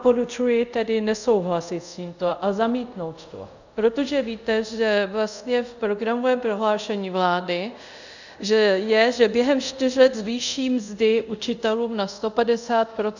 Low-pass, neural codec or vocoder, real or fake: 7.2 kHz; codec, 24 kHz, 0.5 kbps, DualCodec; fake